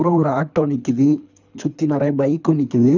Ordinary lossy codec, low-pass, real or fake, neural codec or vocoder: none; 7.2 kHz; fake; codec, 24 kHz, 3 kbps, HILCodec